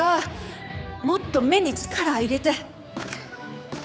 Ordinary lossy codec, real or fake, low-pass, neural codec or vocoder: none; fake; none; codec, 16 kHz, 4 kbps, X-Codec, HuBERT features, trained on general audio